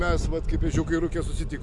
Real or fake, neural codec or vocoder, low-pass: real; none; 10.8 kHz